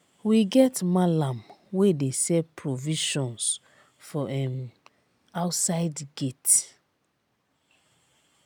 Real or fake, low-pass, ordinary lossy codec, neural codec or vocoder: real; none; none; none